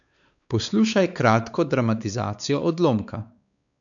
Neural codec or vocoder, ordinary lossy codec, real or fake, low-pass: codec, 16 kHz, 4 kbps, X-Codec, WavLM features, trained on Multilingual LibriSpeech; none; fake; 7.2 kHz